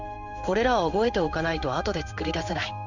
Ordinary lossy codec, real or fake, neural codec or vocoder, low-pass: none; fake; codec, 16 kHz in and 24 kHz out, 1 kbps, XY-Tokenizer; 7.2 kHz